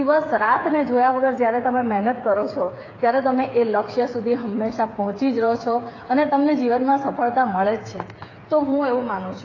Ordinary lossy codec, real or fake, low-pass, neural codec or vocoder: AAC, 32 kbps; fake; 7.2 kHz; codec, 16 kHz, 8 kbps, FreqCodec, smaller model